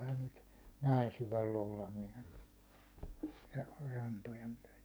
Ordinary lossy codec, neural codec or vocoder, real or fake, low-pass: none; codec, 44.1 kHz, 7.8 kbps, DAC; fake; none